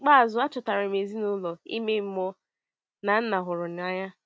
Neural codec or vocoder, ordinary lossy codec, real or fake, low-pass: none; none; real; none